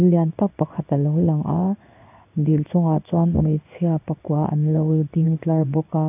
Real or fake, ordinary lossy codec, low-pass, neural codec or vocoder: fake; AAC, 32 kbps; 3.6 kHz; codec, 16 kHz in and 24 kHz out, 1 kbps, XY-Tokenizer